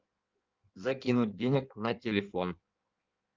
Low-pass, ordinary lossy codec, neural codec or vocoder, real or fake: 7.2 kHz; Opus, 32 kbps; codec, 16 kHz in and 24 kHz out, 1.1 kbps, FireRedTTS-2 codec; fake